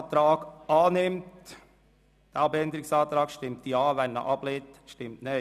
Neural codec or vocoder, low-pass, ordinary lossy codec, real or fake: none; 14.4 kHz; none; real